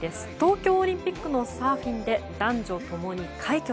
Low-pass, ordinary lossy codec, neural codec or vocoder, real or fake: none; none; none; real